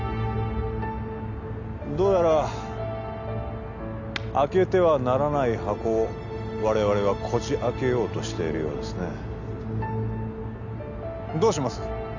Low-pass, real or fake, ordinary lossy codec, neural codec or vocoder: 7.2 kHz; real; none; none